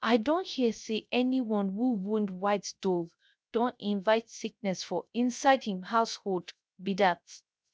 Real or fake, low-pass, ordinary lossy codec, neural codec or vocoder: fake; none; none; codec, 16 kHz, 0.3 kbps, FocalCodec